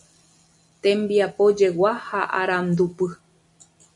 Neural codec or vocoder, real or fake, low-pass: none; real; 10.8 kHz